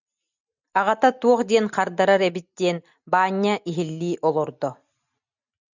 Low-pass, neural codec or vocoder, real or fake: 7.2 kHz; none; real